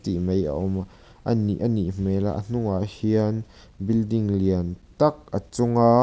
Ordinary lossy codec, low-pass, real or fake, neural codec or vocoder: none; none; real; none